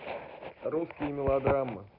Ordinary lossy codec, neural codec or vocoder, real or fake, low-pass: none; none; real; 5.4 kHz